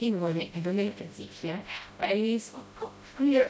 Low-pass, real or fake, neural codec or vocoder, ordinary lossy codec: none; fake; codec, 16 kHz, 0.5 kbps, FreqCodec, smaller model; none